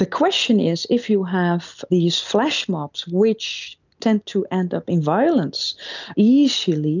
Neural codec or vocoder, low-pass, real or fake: none; 7.2 kHz; real